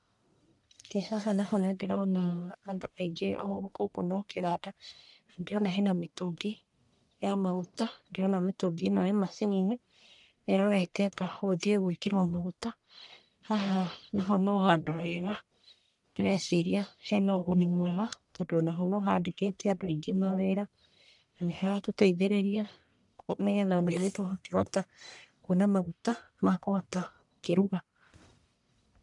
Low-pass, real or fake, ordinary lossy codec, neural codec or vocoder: 10.8 kHz; fake; none; codec, 44.1 kHz, 1.7 kbps, Pupu-Codec